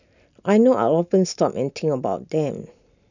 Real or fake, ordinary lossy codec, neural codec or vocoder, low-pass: real; none; none; 7.2 kHz